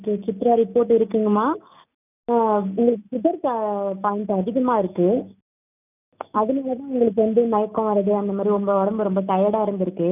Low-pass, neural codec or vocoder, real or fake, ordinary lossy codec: 3.6 kHz; none; real; none